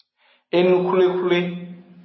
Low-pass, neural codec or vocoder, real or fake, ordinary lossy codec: 7.2 kHz; none; real; MP3, 24 kbps